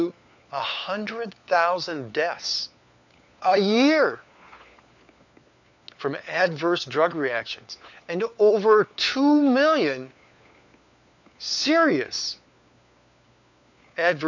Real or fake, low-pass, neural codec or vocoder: fake; 7.2 kHz; codec, 16 kHz, 4 kbps, FunCodec, trained on LibriTTS, 50 frames a second